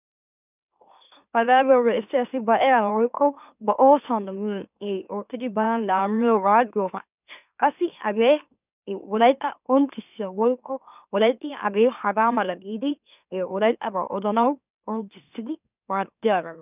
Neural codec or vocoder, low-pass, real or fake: autoencoder, 44.1 kHz, a latent of 192 numbers a frame, MeloTTS; 3.6 kHz; fake